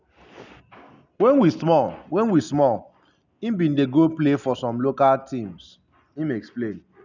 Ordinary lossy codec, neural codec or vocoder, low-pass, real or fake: none; none; 7.2 kHz; real